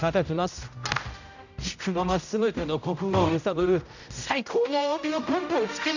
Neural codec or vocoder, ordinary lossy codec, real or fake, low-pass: codec, 16 kHz, 0.5 kbps, X-Codec, HuBERT features, trained on general audio; none; fake; 7.2 kHz